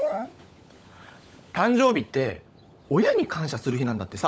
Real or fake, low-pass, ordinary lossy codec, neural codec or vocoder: fake; none; none; codec, 16 kHz, 16 kbps, FunCodec, trained on LibriTTS, 50 frames a second